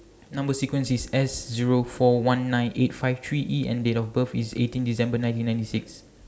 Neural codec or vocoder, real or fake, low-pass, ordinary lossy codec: none; real; none; none